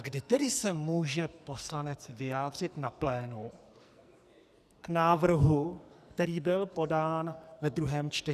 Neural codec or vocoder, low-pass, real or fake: codec, 44.1 kHz, 2.6 kbps, SNAC; 14.4 kHz; fake